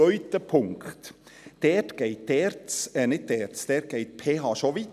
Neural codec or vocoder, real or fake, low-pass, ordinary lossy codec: none; real; 14.4 kHz; none